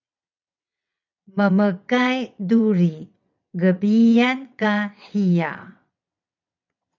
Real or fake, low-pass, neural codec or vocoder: fake; 7.2 kHz; vocoder, 22.05 kHz, 80 mel bands, WaveNeXt